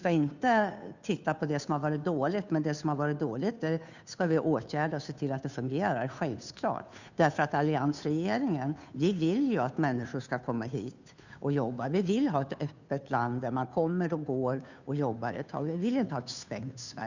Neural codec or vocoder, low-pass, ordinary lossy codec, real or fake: codec, 16 kHz, 2 kbps, FunCodec, trained on Chinese and English, 25 frames a second; 7.2 kHz; none; fake